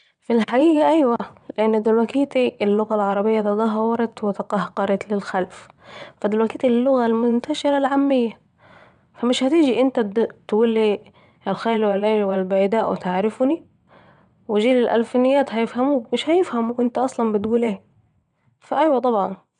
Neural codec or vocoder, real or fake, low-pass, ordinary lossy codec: vocoder, 22.05 kHz, 80 mel bands, WaveNeXt; fake; 9.9 kHz; none